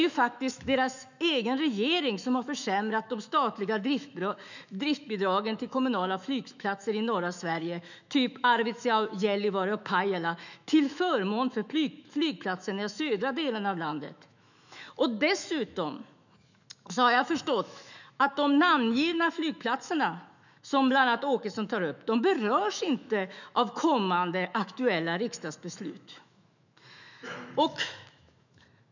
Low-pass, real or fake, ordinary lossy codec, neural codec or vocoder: 7.2 kHz; fake; none; autoencoder, 48 kHz, 128 numbers a frame, DAC-VAE, trained on Japanese speech